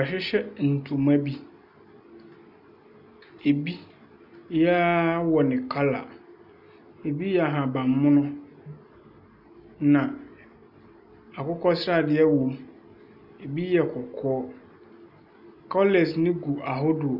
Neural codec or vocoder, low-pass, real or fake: none; 5.4 kHz; real